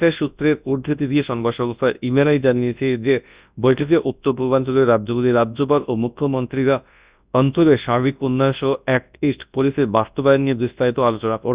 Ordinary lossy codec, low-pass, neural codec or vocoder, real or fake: Opus, 64 kbps; 3.6 kHz; codec, 24 kHz, 0.9 kbps, WavTokenizer, large speech release; fake